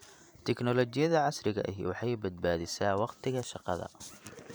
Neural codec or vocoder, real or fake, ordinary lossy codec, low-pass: vocoder, 44.1 kHz, 128 mel bands every 512 samples, BigVGAN v2; fake; none; none